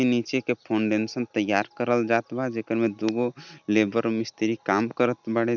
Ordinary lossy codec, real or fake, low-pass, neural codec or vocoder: none; real; 7.2 kHz; none